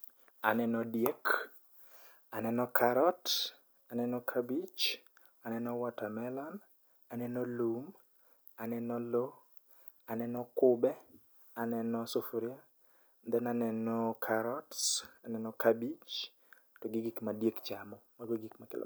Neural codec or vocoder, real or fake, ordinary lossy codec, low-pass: none; real; none; none